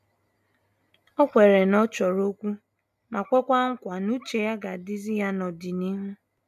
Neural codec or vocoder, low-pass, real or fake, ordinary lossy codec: none; 14.4 kHz; real; none